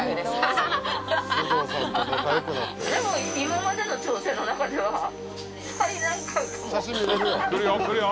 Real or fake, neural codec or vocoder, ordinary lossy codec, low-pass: real; none; none; none